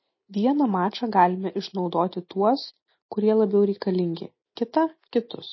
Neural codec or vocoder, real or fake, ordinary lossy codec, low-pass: none; real; MP3, 24 kbps; 7.2 kHz